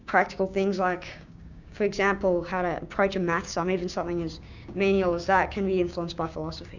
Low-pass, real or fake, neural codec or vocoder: 7.2 kHz; fake; codec, 16 kHz, 6 kbps, DAC